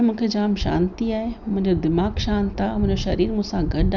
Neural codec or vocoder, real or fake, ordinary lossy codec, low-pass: none; real; none; 7.2 kHz